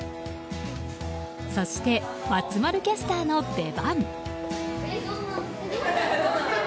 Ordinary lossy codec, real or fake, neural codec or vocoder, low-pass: none; real; none; none